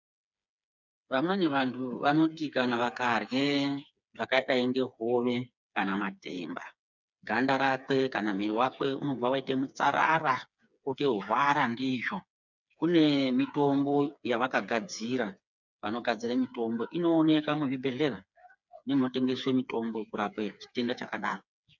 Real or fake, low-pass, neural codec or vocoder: fake; 7.2 kHz; codec, 16 kHz, 4 kbps, FreqCodec, smaller model